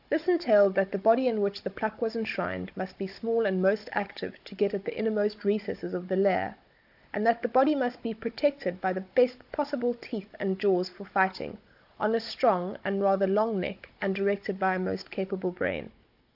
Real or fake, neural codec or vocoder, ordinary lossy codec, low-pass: fake; codec, 16 kHz, 16 kbps, FunCodec, trained on Chinese and English, 50 frames a second; AAC, 48 kbps; 5.4 kHz